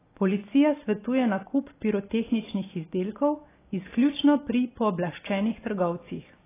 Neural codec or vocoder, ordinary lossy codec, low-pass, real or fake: none; AAC, 16 kbps; 3.6 kHz; real